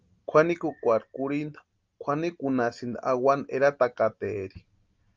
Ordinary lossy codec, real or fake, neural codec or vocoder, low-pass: Opus, 24 kbps; real; none; 7.2 kHz